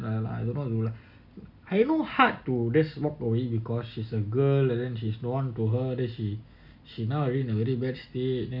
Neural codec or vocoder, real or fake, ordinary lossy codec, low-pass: none; real; MP3, 48 kbps; 5.4 kHz